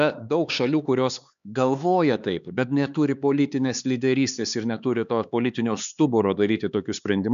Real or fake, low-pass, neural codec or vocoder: fake; 7.2 kHz; codec, 16 kHz, 4 kbps, X-Codec, HuBERT features, trained on LibriSpeech